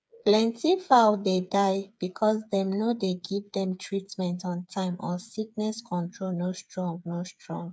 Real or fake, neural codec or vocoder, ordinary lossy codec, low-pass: fake; codec, 16 kHz, 8 kbps, FreqCodec, smaller model; none; none